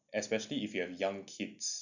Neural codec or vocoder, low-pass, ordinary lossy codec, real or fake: none; 7.2 kHz; none; real